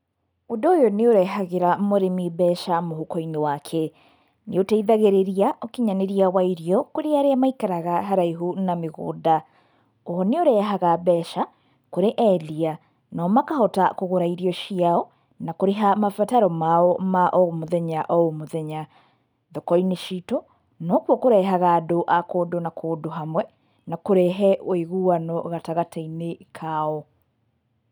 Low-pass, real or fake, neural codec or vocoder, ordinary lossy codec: 19.8 kHz; real; none; none